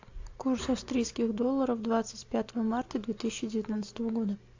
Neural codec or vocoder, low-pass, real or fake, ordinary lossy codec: none; 7.2 kHz; real; AAC, 48 kbps